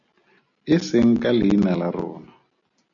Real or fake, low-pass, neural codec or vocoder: real; 7.2 kHz; none